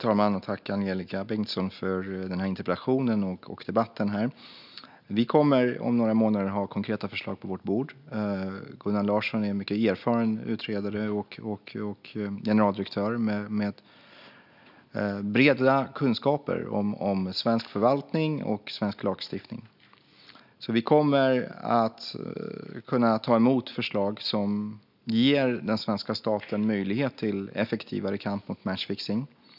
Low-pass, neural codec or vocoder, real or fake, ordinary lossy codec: 5.4 kHz; none; real; none